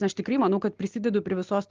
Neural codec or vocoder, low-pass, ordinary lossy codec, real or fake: none; 7.2 kHz; Opus, 24 kbps; real